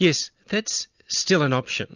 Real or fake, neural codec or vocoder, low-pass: real; none; 7.2 kHz